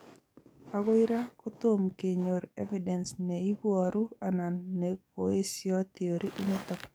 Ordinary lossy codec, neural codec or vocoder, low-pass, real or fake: none; codec, 44.1 kHz, 7.8 kbps, DAC; none; fake